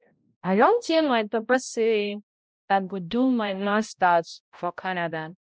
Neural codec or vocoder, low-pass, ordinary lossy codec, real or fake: codec, 16 kHz, 0.5 kbps, X-Codec, HuBERT features, trained on balanced general audio; none; none; fake